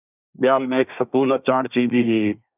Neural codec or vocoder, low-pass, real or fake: codec, 24 kHz, 1 kbps, SNAC; 3.6 kHz; fake